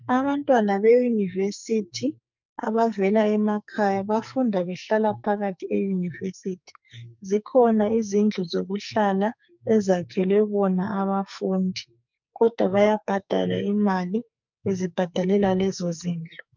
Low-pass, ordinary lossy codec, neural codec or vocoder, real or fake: 7.2 kHz; MP3, 64 kbps; codec, 44.1 kHz, 2.6 kbps, SNAC; fake